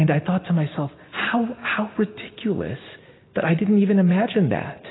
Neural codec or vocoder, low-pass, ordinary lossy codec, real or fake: none; 7.2 kHz; AAC, 16 kbps; real